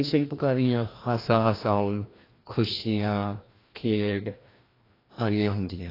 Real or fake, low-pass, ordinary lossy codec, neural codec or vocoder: fake; 5.4 kHz; AAC, 24 kbps; codec, 16 kHz, 1 kbps, FreqCodec, larger model